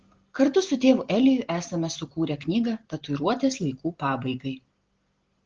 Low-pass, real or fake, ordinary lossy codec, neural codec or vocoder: 7.2 kHz; real; Opus, 16 kbps; none